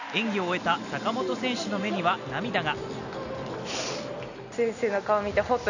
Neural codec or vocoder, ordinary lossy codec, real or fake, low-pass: none; none; real; 7.2 kHz